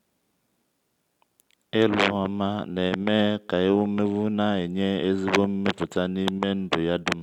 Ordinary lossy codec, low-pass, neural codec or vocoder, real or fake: none; 19.8 kHz; none; real